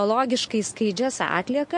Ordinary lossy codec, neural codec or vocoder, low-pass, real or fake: MP3, 48 kbps; none; 10.8 kHz; real